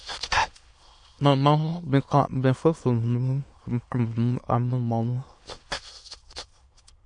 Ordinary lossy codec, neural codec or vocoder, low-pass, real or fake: MP3, 48 kbps; autoencoder, 22.05 kHz, a latent of 192 numbers a frame, VITS, trained on many speakers; 9.9 kHz; fake